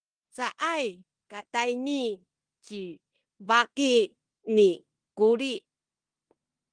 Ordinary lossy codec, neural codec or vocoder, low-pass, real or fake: Opus, 24 kbps; codec, 16 kHz in and 24 kHz out, 0.9 kbps, LongCat-Audio-Codec, four codebook decoder; 9.9 kHz; fake